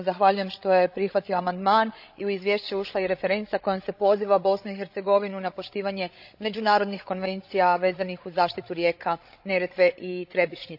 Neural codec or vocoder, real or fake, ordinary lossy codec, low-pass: codec, 16 kHz, 16 kbps, FreqCodec, larger model; fake; AAC, 48 kbps; 5.4 kHz